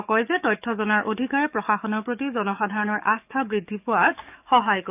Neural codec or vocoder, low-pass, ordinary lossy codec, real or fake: codec, 44.1 kHz, 7.8 kbps, DAC; 3.6 kHz; none; fake